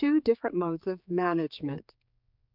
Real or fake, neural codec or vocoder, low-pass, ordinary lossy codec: fake; codec, 16 kHz, 4 kbps, X-Codec, HuBERT features, trained on general audio; 5.4 kHz; MP3, 48 kbps